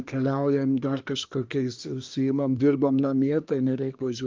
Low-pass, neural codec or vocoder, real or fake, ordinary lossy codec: 7.2 kHz; codec, 24 kHz, 1 kbps, SNAC; fake; Opus, 24 kbps